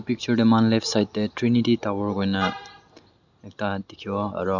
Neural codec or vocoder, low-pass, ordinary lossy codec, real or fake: none; 7.2 kHz; none; real